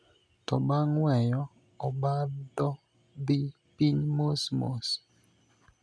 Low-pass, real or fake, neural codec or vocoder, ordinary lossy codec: none; real; none; none